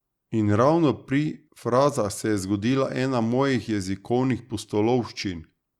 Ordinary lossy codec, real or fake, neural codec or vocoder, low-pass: Opus, 64 kbps; real; none; 19.8 kHz